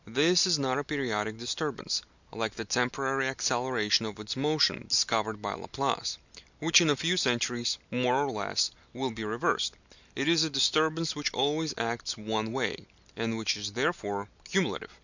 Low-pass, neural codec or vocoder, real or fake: 7.2 kHz; none; real